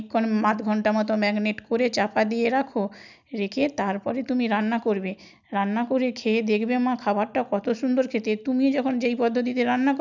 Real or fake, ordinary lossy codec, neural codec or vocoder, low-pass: real; none; none; 7.2 kHz